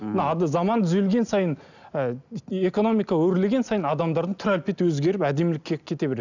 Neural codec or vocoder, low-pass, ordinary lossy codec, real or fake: none; 7.2 kHz; none; real